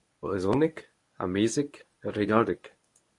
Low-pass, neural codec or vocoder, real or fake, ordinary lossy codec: 10.8 kHz; codec, 24 kHz, 0.9 kbps, WavTokenizer, medium speech release version 1; fake; MP3, 64 kbps